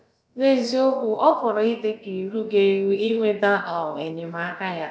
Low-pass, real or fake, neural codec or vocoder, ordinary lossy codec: none; fake; codec, 16 kHz, about 1 kbps, DyCAST, with the encoder's durations; none